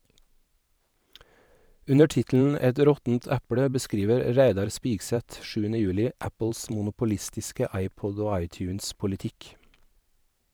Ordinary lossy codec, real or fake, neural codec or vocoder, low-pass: none; fake; vocoder, 44.1 kHz, 128 mel bands every 512 samples, BigVGAN v2; none